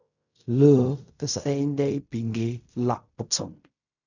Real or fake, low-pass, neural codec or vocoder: fake; 7.2 kHz; codec, 16 kHz in and 24 kHz out, 0.4 kbps, LongCat-Audio-Codec, fine tuned four codebook decoder